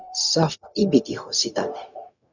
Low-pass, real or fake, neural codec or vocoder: 7.2 kHz; fake; codec, 16 kHz, 0.4 kbps, LongCat-Audio-Codec